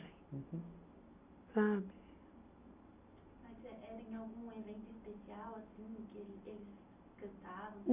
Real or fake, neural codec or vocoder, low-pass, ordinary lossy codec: real; none; 3.6 kHz; none